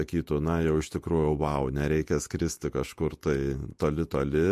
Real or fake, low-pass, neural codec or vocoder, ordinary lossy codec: fake; 14.4 kHz; vocoder, 48 kHz, 128 mel bands, Vocos; MP3, 64 kbps